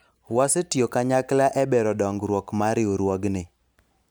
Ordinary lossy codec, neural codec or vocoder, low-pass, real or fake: none; none; none; real